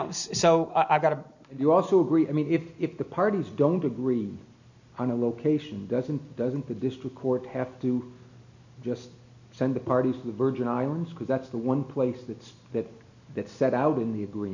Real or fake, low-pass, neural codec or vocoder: real; 7.2 kHz; none